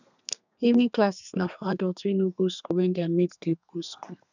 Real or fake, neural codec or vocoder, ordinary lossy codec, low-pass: fake; codec, 32 kHz, 1.9 kbps, SNAC; none; 7.2 kHz